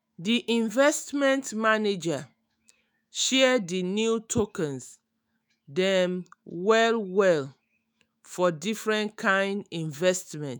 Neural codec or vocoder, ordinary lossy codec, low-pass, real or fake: autoencoder, 48 kHz, 128 numbers a frame, DAC-VAE, trained on Japanese speech; none; none; fake